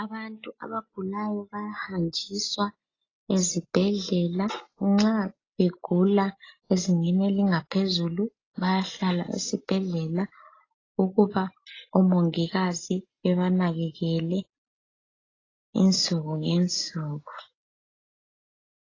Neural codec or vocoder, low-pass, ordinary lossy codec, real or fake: none; 7.2 kHz; AAC, 32 kbps; real